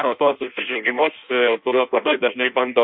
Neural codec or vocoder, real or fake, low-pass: codec, 16 kHz in and 24 kHz out, 0.6 kbps, FireRedTTS-2 codec; fake; 5.4 kHz